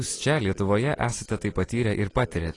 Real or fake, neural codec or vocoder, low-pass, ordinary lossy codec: real; none; 10.8 kHz; AAC, 32 kbps